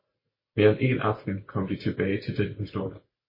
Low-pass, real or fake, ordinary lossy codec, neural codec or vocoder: 5.4 kHz; real; MP3, 24 kbps; none